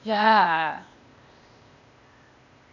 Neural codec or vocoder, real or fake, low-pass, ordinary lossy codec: codec, 16 kHz, 0.8 kbps, ZipCodec; fake; 7.2 kHz; none